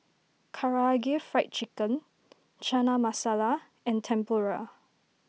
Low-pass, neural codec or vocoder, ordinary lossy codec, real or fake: none; none; none; real